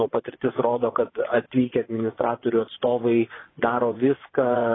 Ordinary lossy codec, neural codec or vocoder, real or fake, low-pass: AAC, 16 kbps; vocoder, 24 kHz, 100 mel bands, Vocos; fake; 7.2 kHz